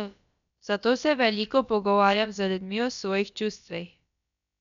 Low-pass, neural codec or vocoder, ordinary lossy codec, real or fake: 7.2 kHz; codec, 16 kHz, about 1 kbps, DyCAST, with the encoder's durations; none; fake